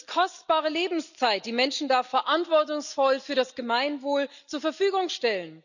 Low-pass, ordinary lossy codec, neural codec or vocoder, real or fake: 7.2 kHz; none; none; real